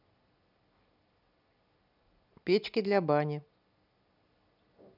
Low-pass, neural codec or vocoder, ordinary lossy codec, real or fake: 5.4 kHz; none; none; real